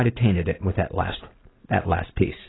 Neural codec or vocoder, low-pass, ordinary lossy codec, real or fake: none; 7.2 kHz; AAC, 16 kbps; real